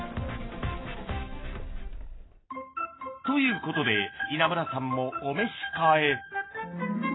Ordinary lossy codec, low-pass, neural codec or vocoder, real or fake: AAC, 16 kbps; 7.2 kHz; none; real